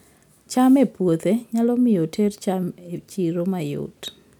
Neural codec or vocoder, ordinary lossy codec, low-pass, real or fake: none; none; 19.8 kHz; real